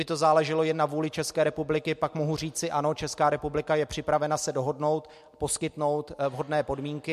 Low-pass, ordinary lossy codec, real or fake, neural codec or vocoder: 14.4 kHz; MP3, 64 kbps; real; none